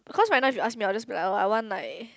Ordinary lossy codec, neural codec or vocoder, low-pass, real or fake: none; none; none; real